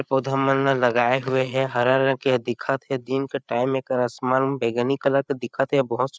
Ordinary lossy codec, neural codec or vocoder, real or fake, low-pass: none; codec, 16 kHz, 8 kbps, FreqCodec, larger model; fake; none